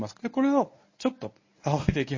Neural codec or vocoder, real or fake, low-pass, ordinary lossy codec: codec, 24 kHz, 0.9 kbps, WavTokenizer, medium speech release version 1; fake; 7.2 kHz; MP3, 32 kbps